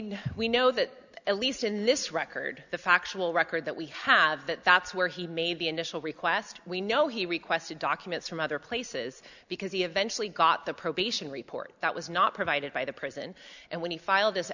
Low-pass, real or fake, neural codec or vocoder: 7.2 kHz; real; none